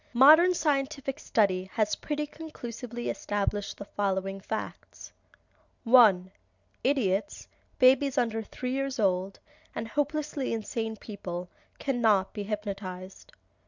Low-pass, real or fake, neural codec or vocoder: 7.2 kHz; real; none